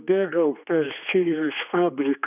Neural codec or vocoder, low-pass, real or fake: codec, 16 kHz, 2 kbps, X-Codec, HuBERT features, trained on general audio; 3.6 kHz; fake